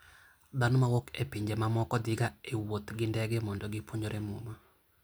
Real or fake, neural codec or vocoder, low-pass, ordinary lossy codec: real; none; none; none